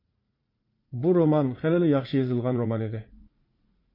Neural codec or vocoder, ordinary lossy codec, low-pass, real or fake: vocoder, 44.1 kHz, 80 mel bands, Vocos; MP3, 32 kbps; 5.4 kHz; fake